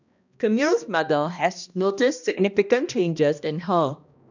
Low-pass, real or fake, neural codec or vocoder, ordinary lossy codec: 7.2 kHz; fake; codec, 16 kHz, 1 kbps, X-Codec, HuBERT features, trained on balanced general audio; none